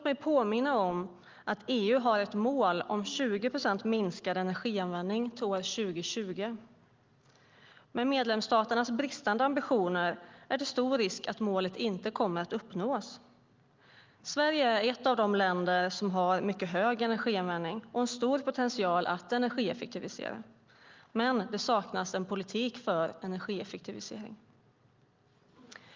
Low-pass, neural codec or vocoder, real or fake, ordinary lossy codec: 7.2 kHz; none; real; Opus, 32 kbps